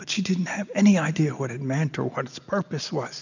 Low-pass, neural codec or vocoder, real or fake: 7.2 kHz; none; real